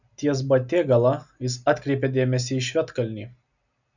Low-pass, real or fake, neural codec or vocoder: 7.2 kHz; real; none